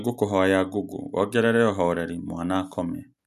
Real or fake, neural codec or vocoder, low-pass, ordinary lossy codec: real; none; 14.4 kHz; none